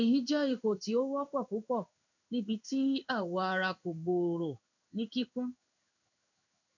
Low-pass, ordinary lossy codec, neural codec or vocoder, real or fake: 7.2 kHz; none; codec, 16 kHz in and 24 kHz out, 1 kbps, XY-Tokenizer; fake